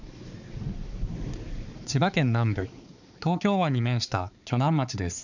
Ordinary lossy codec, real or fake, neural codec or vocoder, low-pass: none; fake; codec, 16 kHz, 4 kbps, X-Codec, HuBERT features, trained on balanced general audio; 7.2 kHz